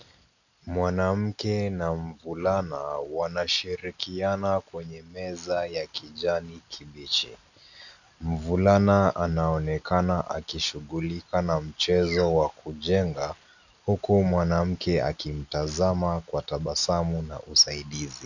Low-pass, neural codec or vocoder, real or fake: 7.2 kHz; none; real